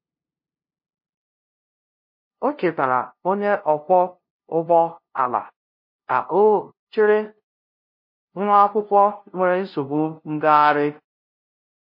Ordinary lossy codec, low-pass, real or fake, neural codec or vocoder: MP3, 32 kbps; 5.4 kHz; fake; codec, 16 kHz, 0.5 kbps, FunCodec, trained on LibriTTS, 25 frames a second